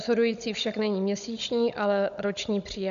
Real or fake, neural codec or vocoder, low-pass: fake; codec, 16 kHz, 16 kbps, FunCodec, trained on Chinese and English, 50 frames a second; 7.2 kHz